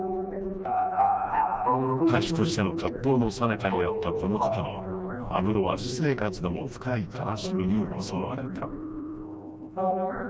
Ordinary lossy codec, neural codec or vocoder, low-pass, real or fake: none; codec, 16 kHz, 1 kbps, FreqCodec, smaller model; none; fake